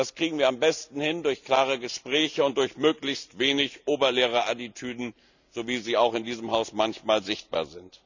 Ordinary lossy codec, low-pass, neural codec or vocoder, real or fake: none; 7.2 kHz; none; real